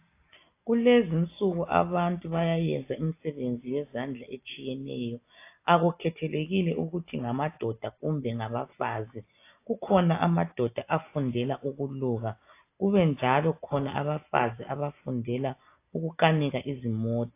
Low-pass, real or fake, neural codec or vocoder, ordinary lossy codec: 3.6 kHz; real; none; AAC, 24 kbps